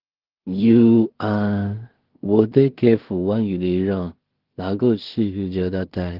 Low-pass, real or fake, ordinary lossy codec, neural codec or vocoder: 5.4 kHz; fake; Opus, 16 kbps; codec, 16 kHz in and 24 kHz out, 0.4 kbps, LongCat-Audio-Codec, two codebook decoder